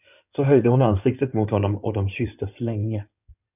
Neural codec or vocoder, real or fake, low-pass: codec, 16 kHz in and 24 kHz out, 2.2 kbps, FireRedTTS-2 codec; fake; 3.6 kHz